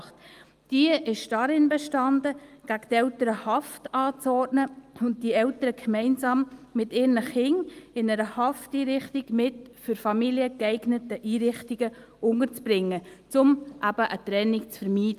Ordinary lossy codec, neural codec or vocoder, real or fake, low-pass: Opus, 32 kbps; none; real; 14.4 kHz